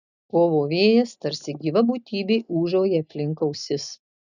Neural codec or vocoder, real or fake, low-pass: none; real; 7.2 kHz